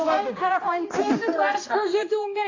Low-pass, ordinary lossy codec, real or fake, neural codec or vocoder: 7.2 kHz; AAC, 32 kbps; fake; codec, 16 kHz, 1 kbps, X-Codec, HuBERT features, trained on balanced general audio